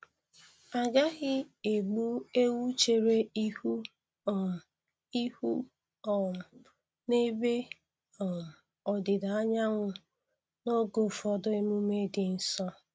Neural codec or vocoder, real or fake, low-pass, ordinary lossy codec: none; real; none; none